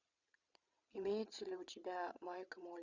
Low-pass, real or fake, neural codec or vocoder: 7.2 kHz; fake; vocoder, 22.05 kHz, 80 mel bands, WaveNeXt